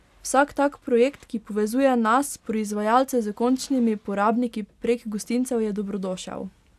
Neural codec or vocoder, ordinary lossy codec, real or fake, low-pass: none; none; real; 14.4 kHz